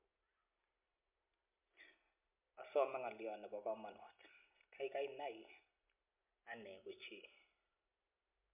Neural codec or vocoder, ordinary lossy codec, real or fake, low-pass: none; MP3, 32 kbps; real; 3.6 kHz